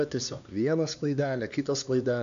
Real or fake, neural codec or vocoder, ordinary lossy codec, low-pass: fake; codec, 16 kHz, 2 kbps, X-Codec, HuBERT features, trained on LibriSpeech; AAC, 96 kbps; 7.2 kHz